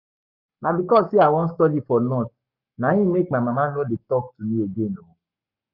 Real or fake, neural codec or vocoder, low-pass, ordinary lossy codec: fake; codec, 44.1 kHz, 7.8 kbps, Pupu-Codec; 5.4 kHz; none